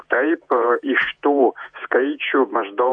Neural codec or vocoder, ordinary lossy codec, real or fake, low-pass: vocoder, 48 kHz, 128 mel bands, Vocos; AAC, 64 kbps; fake; 9.9 kHz